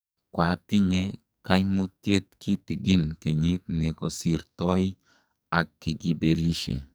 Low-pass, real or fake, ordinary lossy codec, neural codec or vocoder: none; fake; none; codec, 44.1 kHz, 2.6 kbps, SNAC